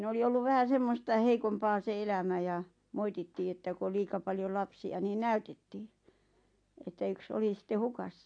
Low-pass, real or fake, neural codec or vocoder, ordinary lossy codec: 9.9 kHz; real; none; AAC, 48 kbps